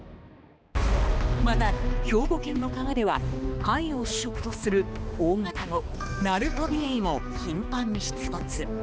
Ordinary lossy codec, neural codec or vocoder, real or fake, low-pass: none; codec, 16 kHz, 2 kbps, X-Codec, HuBERT features, trained on balanced general audio; fake; none